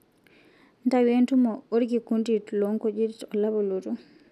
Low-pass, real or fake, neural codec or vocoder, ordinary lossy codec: 14.4 kHz; real; none; none